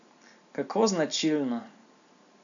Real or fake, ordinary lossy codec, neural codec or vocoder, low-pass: real; none; none; 7.2 kHz